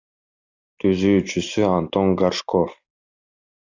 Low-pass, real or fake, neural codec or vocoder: 7.2 kHz; real; none